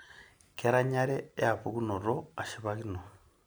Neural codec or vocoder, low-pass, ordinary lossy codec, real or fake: none; none; none; real